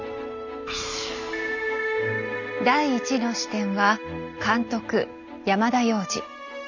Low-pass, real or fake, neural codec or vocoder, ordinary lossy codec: 7.2 kHz; real; none; none